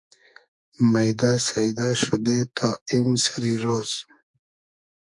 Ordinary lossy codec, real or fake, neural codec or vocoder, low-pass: MP3, 64 kbps; fake; codec, 44.1 kHz, 2.6 kbps, SNAC; 10.8 kHz